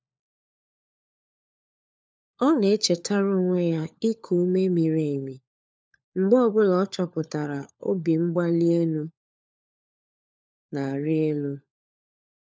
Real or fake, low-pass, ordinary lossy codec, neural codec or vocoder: fake; none; none; codec, 16 kHz, 4 kbps, FunCodec, trained on LibriTTS, 50 frames a second